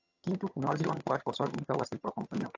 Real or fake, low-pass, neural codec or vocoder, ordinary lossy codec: fake; 7.2 kHz; vocoder, 22.05 kHz, 80 mel bands, HiFi-GAN; AAC, 48 kbps